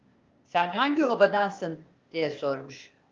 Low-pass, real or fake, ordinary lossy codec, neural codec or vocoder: 7.2 kHz; fake; Opus, 24 kbps; codec, 16 kHz, 0.8 kbps, ZipCodec